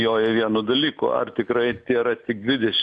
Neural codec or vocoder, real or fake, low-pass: none; real; 10.8 kHz